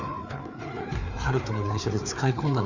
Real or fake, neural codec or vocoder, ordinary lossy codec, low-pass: fake; codec, 16 kHz, 4 kbps, FreqCodec, larger model; none; 7.2 kHz